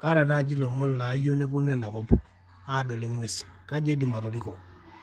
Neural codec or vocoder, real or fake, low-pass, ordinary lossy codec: codec, 32 kHz, 1.9 kbps, SNAC; fake; 14.4 kHz; Opus, 32 kbps